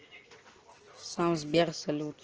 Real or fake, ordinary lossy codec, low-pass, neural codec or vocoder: real; Opus, 16 kbps; 7.2 kHz; none